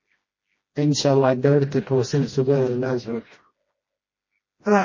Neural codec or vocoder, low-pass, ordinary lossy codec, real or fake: codec, 16 kHz, 1 kbps, FreqCodec, smaller model; 7.2 kHz; MP3, 32 kbps; fake